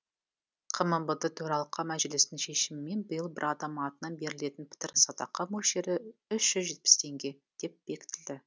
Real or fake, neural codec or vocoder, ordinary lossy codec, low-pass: real; none; none; none